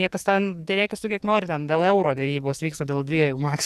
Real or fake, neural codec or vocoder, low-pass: fake; codec, 44.1 kHz, 2.6 kbps, SNAC; 14.4 kHz